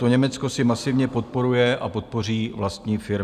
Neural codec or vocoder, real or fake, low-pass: none; real; 14.4 kHz